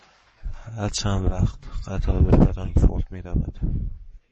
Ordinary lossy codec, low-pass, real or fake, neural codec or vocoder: MP3, 32 kbps; 10.8 kHz; fake; codec, 44.1 kHz, 7.8 kbps, Pupu-Codec